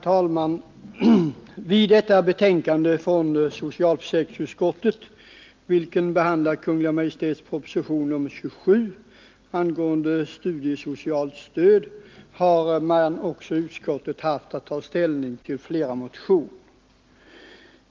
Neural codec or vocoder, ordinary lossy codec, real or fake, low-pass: none; Opus, 24 kbps; real; 7.2 kHz